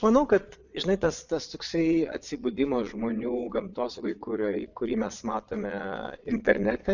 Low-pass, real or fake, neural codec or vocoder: 7.2 kHz; fake; vocoder, 22.05 kHz, 80 mel bands, Vocos